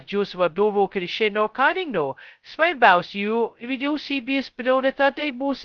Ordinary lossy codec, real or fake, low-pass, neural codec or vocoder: Opus, 24 kbps; fake; 7.2 kHz; codec, 16 kHz, 0.2 kbps, FocalCodec